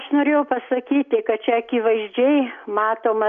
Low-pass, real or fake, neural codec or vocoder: 7.2 kHz; real; none